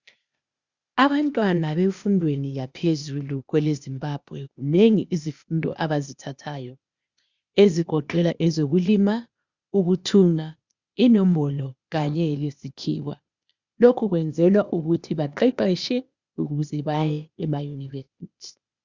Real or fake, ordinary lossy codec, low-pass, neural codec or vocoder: fake; Opus, 64 kbps; 7.2 kHz; codec, 16 kHz, 0.8 kbps, ZipCodec